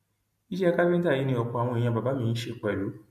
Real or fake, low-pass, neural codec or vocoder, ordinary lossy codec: real; 14.4 kHz; none; MP3, 64 kbps